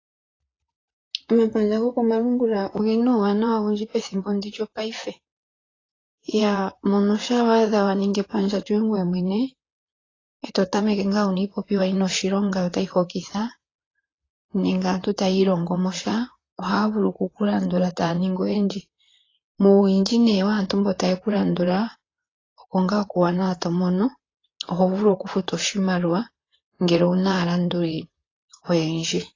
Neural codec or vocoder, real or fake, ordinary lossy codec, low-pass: vocoder, 44.1 kHz, 128 mel bands, Pupu-Vocoder; fake; AAC, 32 kbps; 7.2 kHz